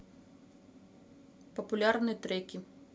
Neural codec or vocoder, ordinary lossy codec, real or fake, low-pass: none; none; real; none